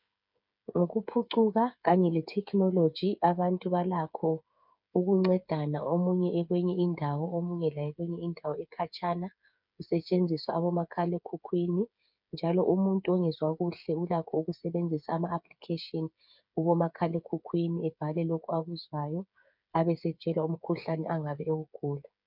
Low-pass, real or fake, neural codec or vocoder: 5.4 kHz; fake; codec, 16 kHz, 8 kbps, FreqCodec, smaller model